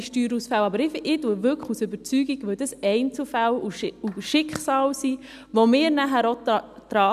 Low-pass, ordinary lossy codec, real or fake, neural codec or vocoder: 14.4 kHz; none; real; none